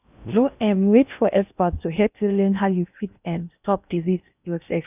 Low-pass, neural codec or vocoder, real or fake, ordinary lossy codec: 3.6 kHz; codec, 16 kHz in and 24 kHz out, 0.6 kbps, FocalCodec, streaming, 2048 codes; fake; none